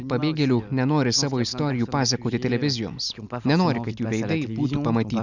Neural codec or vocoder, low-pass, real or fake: none; 7.2 kHz; real